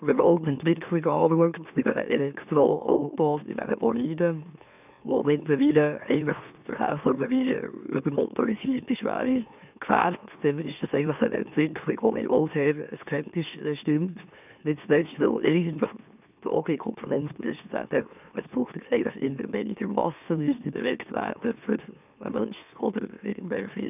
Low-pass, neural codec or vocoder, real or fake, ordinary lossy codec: 3.6 kHz; autoencoder, 44.1 kHz, a latent of 192 numbers a frame, MeloTTS; fake; none